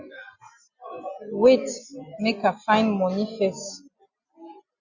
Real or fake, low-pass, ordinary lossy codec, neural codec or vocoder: real; 7.2 kHz; Opus, 64 kbps; none